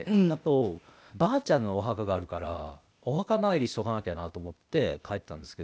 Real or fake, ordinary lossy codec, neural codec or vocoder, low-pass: fake; none; codec, 16 kHz, 0.8 kbps, ZipCodec; none